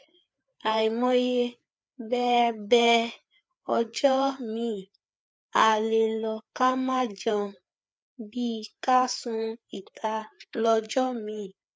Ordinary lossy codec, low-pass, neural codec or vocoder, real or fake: none; none; codec, 16 kHz, 4 kbps, FreqCodec, larger model; fake